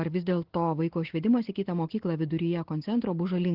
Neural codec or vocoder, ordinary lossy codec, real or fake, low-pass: none; Opus, 16 kbps; real; 5.4 kHz